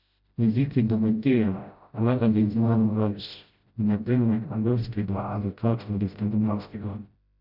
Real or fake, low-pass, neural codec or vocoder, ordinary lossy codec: fake; 5.4 kHz; codec, 16 kHz, 0.5 kbps, FreqCodec, smaller model; none